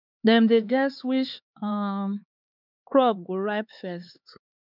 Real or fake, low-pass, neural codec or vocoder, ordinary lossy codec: fake; 5.4 kHz; codec, 16 kHz, 4 kbps, X-Codec, HuBERT features, trained on LibriSpeech; none